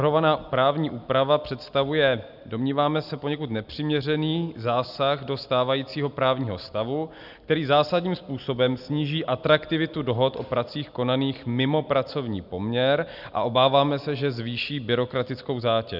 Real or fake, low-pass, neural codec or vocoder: real; 5.4 kHz; none